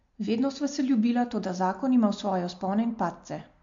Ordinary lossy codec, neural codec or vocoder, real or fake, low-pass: MP3, 48 kbps; none; real; 7.2 kHz